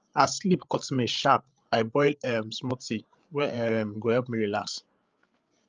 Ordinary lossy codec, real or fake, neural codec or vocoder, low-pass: Opus, 24 kbps; fake; codec, 16 kHz, 8 kbps, FreqCodec, larger model; 7.2 kHz